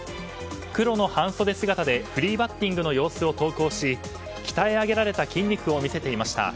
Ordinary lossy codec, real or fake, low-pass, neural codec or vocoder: none; real; none; none